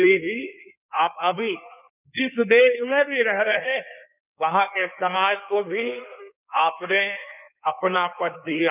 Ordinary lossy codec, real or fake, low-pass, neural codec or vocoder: none; fake; 3.6 kHz; codec, 16 kHz in and 24 kHz out, 1.1 kbps, FireRedTTS-2 codec